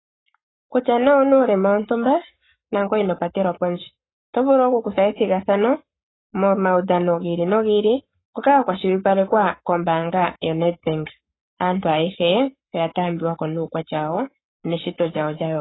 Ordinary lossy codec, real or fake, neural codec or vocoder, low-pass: AAC, 16 kbps; fake; codec, 44.1 kHz, 7.8 kbps, Pupu-Codec; 7.2 kHz